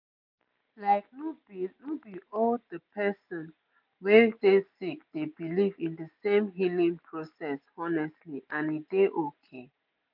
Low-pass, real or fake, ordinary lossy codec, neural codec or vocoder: 5.4 kHz; real; MP3, 48 kbps; none